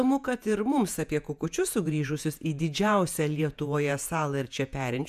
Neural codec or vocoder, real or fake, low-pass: vocoder, 48 kHz, 128 mel bands, Vocos; fake; 14.4 kHz